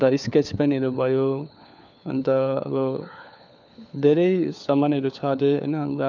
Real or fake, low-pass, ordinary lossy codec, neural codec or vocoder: fake; 7.2 kHz; none; codec, 16 kHz, 4 kbps, FunCodec, trained on LibriTTS, 50 frames a second